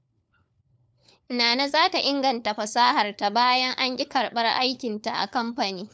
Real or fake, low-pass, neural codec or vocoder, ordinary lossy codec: fake; none; codec, 16 kHz, 4 kbps, FunCodec, trained on LibriTTS, 50 frames a second; none